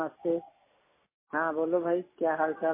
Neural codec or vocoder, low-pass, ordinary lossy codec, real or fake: none; 3.6 kHz; MP3, 16 kbps; real